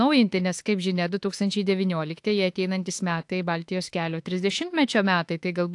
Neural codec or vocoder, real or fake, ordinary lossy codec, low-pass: autoencoder, 48 kHz, 32 numbers a frame, DAC-VAE, trained on Japanese speech; fake; AAC, 64 kbps; 10.8 kHz